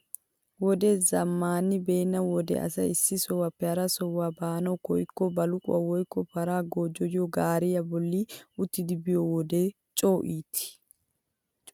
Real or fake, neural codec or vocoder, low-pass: real; none; 19.8 kHz